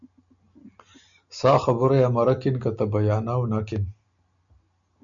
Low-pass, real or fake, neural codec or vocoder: 7.2 kHz; real; none